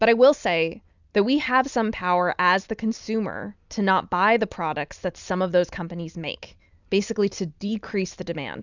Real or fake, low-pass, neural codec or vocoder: real; 7.2 kHz; none